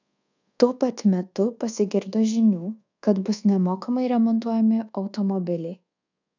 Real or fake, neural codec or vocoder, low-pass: fake; codec, 24 kHz, 1.2 kbps, DualCodec; 7.2 kHz